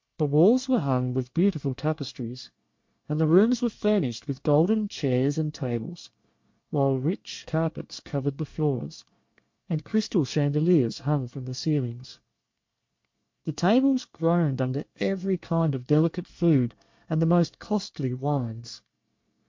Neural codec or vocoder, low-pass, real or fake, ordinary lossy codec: codec, 24 kHz, 1 kbps, SNAC; 7.2 kHz; fake; MP3, 48 kbps